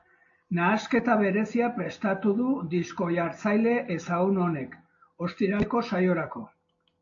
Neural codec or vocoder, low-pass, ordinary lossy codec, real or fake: none; 7.2 kHz; AAC, 48 kbps; real